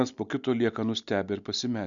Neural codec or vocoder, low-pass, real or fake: none; 7.2 kHz; real